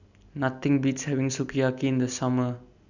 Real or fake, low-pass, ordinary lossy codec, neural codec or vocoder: real; 7.2 kHz; none; none